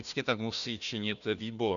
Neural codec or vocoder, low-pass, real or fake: codec, 16 kHz, 1 kbps, FunCodec, trained on Chinese and English, 50 frames a second; 7.2 kHz; fake